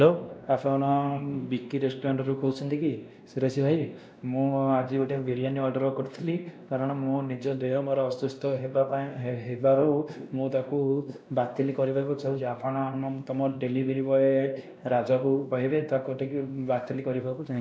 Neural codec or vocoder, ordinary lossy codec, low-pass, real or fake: codec, 16 kHz, 1 kbps, X-Codec, WavLM features, trained on Multilingual LibriSpeech; none; none; fake